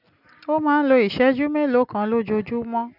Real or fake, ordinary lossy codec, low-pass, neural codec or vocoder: real; none; 5.4 kHz; none